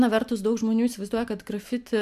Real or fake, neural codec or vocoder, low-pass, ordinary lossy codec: real; none; 14.4 kHz; MP3, 96 kbps